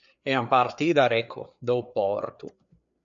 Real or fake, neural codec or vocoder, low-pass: fake; codec, 16 kHz, 4 kbps, FreqCodec, larger model; 7.2 kHz